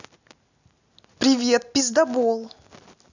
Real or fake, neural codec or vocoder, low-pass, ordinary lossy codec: real; none; 7.2 kHz; none